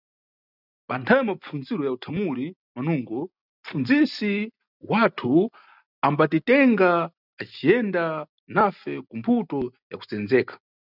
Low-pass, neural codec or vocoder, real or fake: 5.4 kHz; none; real